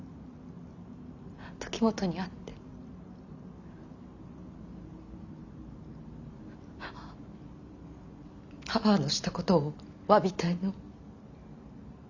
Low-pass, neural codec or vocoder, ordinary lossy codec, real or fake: 7.2 kHz; none; none; real